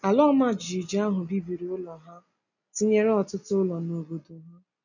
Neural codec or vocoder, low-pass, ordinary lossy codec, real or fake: none; 7.2 kHz; none; real